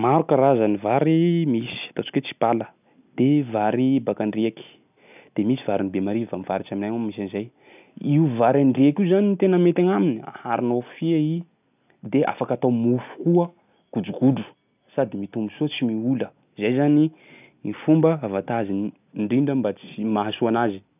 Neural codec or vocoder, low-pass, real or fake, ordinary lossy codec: none; 3.6 kHz; real; none